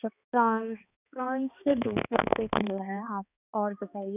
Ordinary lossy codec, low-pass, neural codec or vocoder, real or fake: none; 3.6 kHz; codec, 16 kHz, 2 kbps, X-Codec, HuBERT features, trained on balanced general audio; fake